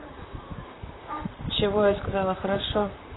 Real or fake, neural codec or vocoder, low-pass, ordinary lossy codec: fake; vocoder, 44.1 kHz, 128 mel bands, Pupu-Vocoder; 7.2 kHz; AAC, 16 kbps